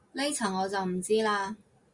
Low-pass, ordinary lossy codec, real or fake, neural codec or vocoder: 10.8 kHz; Opus, 64 kbps; real; none